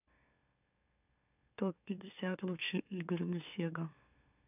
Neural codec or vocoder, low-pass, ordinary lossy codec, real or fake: autoencoder, 44.1 kHz, a latent of 192 numbers a frame, MeloTTS; 3.6 kHz; none; fake